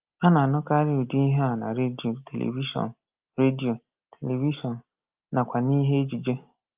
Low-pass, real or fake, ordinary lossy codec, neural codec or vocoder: 3.6 kHz; real; Opus, 24 kbps; none